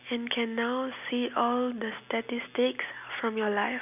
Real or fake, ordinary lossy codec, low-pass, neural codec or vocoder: real; none; 3.6 kHz; none